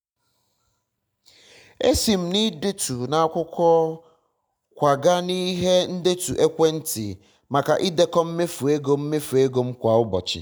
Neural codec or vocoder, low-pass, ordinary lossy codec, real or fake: none; none; none; real